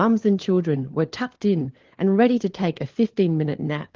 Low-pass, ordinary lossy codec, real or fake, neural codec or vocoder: 7.2 kHz; Opus, 16 kbps; fake; codec, 16 kHz, 4 kbps, FunCodec, trained on LibriTTS, 50 frames a second